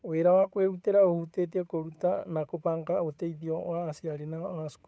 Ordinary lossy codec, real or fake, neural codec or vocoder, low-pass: none; fake; codec, 16 kHz, 8 kbps, FunCodec, trained on Chinese and English, 25 frames a second; none